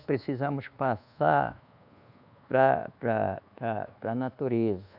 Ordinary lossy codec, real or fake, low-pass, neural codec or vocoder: none; fake; 5.4 kHz; codec, 24 kHz, 1.2 kbps, DualCodec